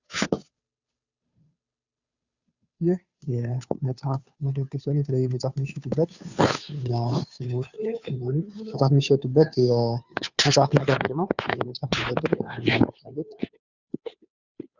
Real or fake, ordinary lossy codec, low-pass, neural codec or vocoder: fake; Opus, 64 kbps; 7.2 kHz; codec, 16 kHz, 2 kbps, FunCodec, trained on Chinese and English, 25 frames a second